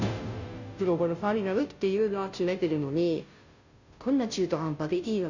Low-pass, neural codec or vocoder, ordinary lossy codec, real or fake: 7.2 kHz; codec, 16 kHz, 0.5 kbps, FunCodec, trained on Chinese and English, 25 frames a second; none; fake